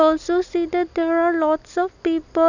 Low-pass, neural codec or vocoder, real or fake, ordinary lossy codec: 7.2 kHz; none; real; none